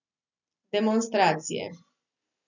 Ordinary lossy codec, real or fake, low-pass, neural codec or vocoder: none; real; 7.2 kHz; none